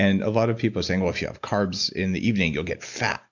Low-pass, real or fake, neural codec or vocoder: 7.2 kHz; real; none